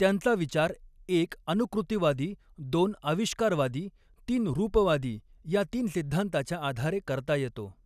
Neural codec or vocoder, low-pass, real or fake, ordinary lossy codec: none; 14.4 kHz; real; none